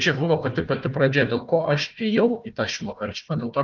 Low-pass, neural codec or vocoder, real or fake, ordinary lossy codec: 7.2 kHz; codec, 16 kHz, 1 kbps, FunCodec, trained on Chinese and English, 50 frames a second; fake; Opus, 24 kbps